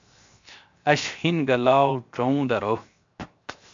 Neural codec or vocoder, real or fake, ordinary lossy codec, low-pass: codec, 16 kHz, 0.7 kbps, FocalCodec; fake; MP3, 64 kbps; 7.2 kHz